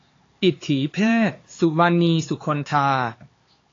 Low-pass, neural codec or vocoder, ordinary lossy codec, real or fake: 7.2 kHz; codec, 16 kHz, 4 kbps, X-Codec, HuBERT features, trained on LibriSpeech; AAC, 32 kbps; fake